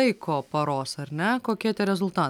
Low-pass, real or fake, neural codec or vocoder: 19.8 kHz; real; none